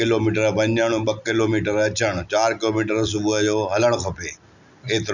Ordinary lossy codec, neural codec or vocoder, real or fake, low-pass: none; none; real; 7.2 kHz